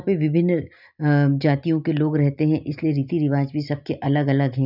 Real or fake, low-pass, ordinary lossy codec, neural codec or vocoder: real; 5.4 kHz; none; none